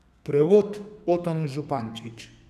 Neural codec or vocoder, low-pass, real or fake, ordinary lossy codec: codec, 32 kHz, 1.9 kbps, SNAC; 14.4 kHz; fake; none